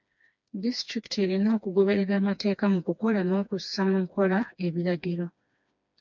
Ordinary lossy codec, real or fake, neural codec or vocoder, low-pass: MP3, 64 kbps; fake; codec, 16 kHz, 2 kbps, FreqCodec, smaller model; 7.2 kHz